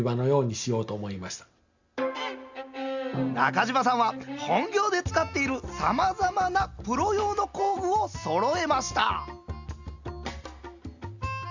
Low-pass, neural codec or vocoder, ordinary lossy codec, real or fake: 7.2 kHz; none; Opus, 64 kbps; real